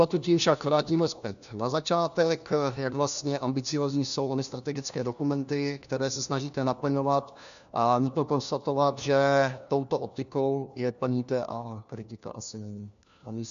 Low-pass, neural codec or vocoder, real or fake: 7.2 kHz; codec, 16 kHz, 1 kbps, FunCodec, trained on LibriTTS, 50 frames a second; fake